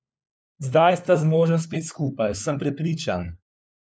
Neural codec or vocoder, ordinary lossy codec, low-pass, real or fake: codec, 16 kHz, 4 kbps, FunCodec, trained on LibriTTS, 50 frames a second; none; none; fake